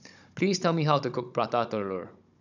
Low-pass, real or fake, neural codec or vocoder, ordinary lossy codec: 7.2 kHz; fake; codec, 16 kHz, 16 kbps, FunCodec, trained on Chinese and English, 50 frames a second; none